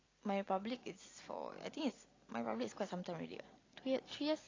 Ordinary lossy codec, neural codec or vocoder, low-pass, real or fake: AAC, 32 kbps; none; 7.2 kHz; real